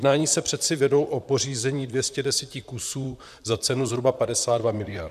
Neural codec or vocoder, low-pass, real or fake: vocoder, 44.1 kHz, 128 mel bands, Pupu-Vocoder; 14.4 kHz; fake